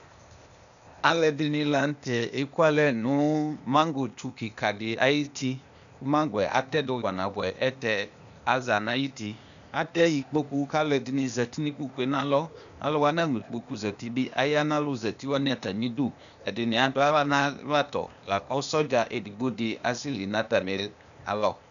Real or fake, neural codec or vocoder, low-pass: fake; codec, 16 kHz, 0.8 kbps, ZipCodec; 7.2 kHz